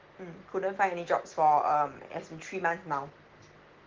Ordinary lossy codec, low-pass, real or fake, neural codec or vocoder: Opus, 16 kbps; 7.2 kHz; real; none